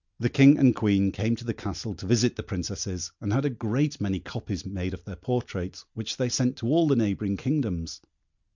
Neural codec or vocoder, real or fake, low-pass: none; real; 7.2 kHz